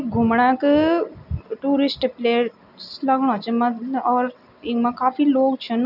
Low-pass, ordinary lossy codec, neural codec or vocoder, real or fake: 5.4 kHz; none; none; real